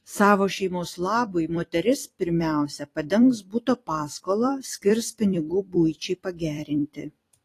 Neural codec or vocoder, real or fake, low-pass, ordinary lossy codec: vocoder, 44.1 kHz, 128 mel bands every 256 samples, BigVGAN v2; fake; 14.4 kHz; AAC, 48 kbps